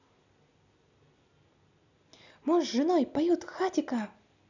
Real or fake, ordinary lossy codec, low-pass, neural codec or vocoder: real; none; 7.2 kHz; none